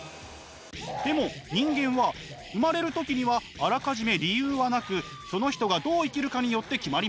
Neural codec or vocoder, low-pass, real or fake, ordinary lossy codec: none; none; real; none